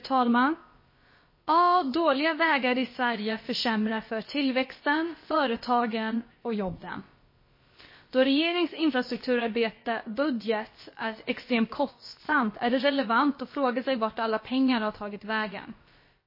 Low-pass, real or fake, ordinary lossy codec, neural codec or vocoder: 5.4 kHz; fake; MP3, 24 kbps; codec, 16 kHz, about 1 kbps, DyCAST, with the encoder's durations